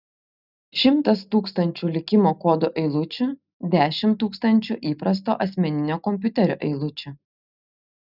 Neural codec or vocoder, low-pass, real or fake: none; 5.4 kHz; real